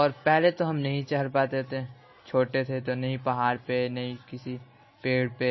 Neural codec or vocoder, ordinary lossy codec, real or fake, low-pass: none; MP3, 24 kbps; real; 7.2 kHz